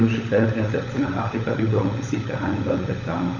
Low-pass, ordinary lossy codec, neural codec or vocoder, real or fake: 7.2 kHz; none; codec, 16 kHz, 16 kbps, FunCodec, trained on LibriTTS, 50 frames a second; fake